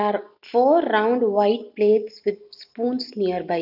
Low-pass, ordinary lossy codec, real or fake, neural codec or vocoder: 5.4 kHz; none; real; none